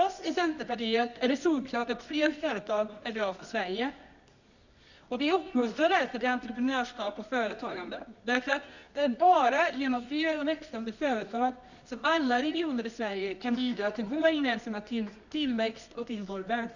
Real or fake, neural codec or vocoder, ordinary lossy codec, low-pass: fake; codec, 24 kHz, 0.9 kbps, WavTokenizer, medium music audio release; none; 7.2 kHz